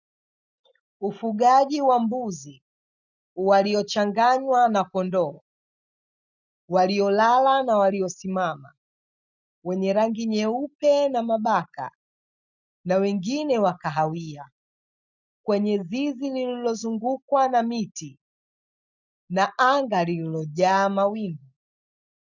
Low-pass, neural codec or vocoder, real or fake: 7.2 kHz; none; real